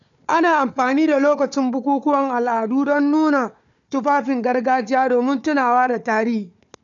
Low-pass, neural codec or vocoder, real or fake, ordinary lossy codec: 7.2 kHz; codec, 16 kHz, 4 kbps, FunCodec, trained on Chinese and English, 50 frames a second; fake; none